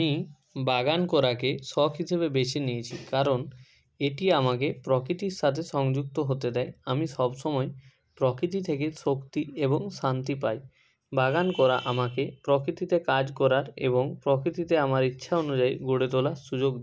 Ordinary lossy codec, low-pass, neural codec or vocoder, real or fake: none; none; none; real